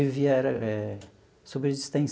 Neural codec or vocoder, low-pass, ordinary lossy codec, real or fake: none; none; none; real